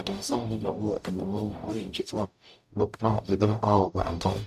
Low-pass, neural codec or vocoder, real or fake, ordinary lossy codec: 14.4 kHz; codec, 44.1 kHz, 0.9 kbps, DAC; fake; none